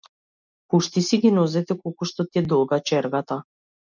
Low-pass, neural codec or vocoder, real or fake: 7.2 kHz; none; real